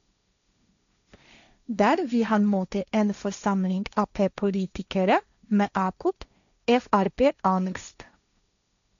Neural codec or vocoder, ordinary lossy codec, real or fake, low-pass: codec, 16 kHz, 1.1 kbps, Voila-Tokenizer; none; fake; 7.2 kHz